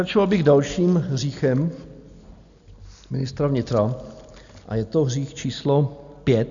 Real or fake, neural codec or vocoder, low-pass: real; none; 7.2 kHz